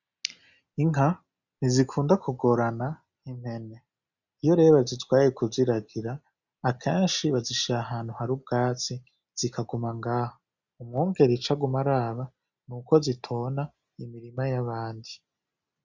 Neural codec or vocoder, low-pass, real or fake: none; 7.2 kHz; real